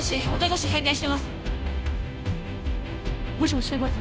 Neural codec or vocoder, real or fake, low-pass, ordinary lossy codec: codec, 16 kHz, 0.5 kbps, FunCodec, trained on Chinese and English, 25 frames a second; fake; none; none